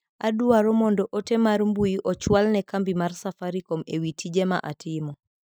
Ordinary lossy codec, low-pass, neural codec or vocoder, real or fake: none; none; none; real